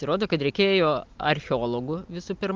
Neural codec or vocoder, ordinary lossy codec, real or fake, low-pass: none; Opus, 32 kbps; real; 7.2 kHz